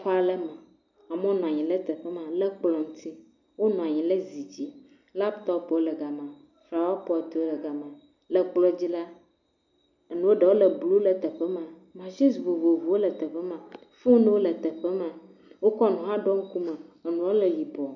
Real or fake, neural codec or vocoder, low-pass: real; none; 7.2 kHz